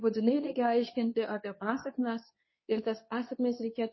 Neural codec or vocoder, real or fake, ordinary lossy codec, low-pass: codec, 24 kHz, 0.9 kbps, WavTokenizer, medium speech release version 2; fake; MP3, 24 kbps; 7.2 kHz